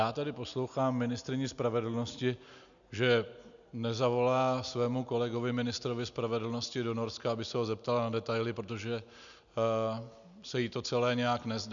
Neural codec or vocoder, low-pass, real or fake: none; 7.2 kHz; real